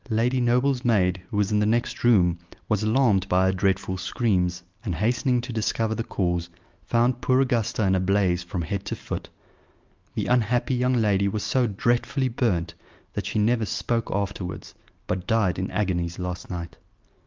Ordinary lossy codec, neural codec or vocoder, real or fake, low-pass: Opus, 24 kbps; none; real; 7.2 kHz